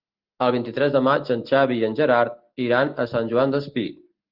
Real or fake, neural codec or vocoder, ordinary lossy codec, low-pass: real; none; Opus, 24 kbps; 5.4 kHz